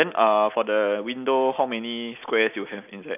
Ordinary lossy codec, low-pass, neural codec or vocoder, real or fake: none; 3.6 kHz; none; real